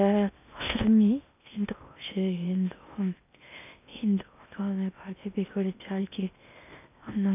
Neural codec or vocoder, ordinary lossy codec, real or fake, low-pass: codec, 16 kHz in and 24 kHz out, 0.8 kbps, FocalCodec, streaming, 65536 codes; none; fake; 3.6 kHz